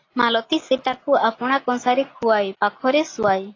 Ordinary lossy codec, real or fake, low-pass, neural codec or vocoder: AAC, 32 kbps; real; 7.2 kHz; none